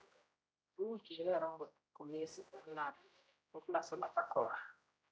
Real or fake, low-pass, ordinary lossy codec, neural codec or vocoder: fake; none; none; codec, 16 kHz, 0.5 kbps, X-Codec, HuBERT features, trained on general audio